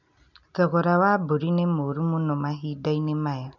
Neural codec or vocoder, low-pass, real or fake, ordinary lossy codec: none; 7.2 kHz; real; none